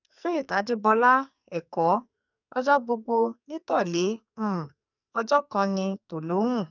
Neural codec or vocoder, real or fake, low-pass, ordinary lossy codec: codec, 44.1 kHz, 2.6 kbps, SNAC; fake; 7.2 kHz; none